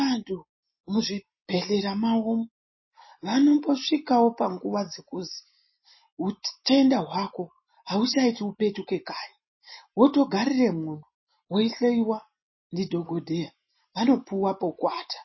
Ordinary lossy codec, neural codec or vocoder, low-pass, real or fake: MP3, 24 kbps; none; 7.2 kHz; real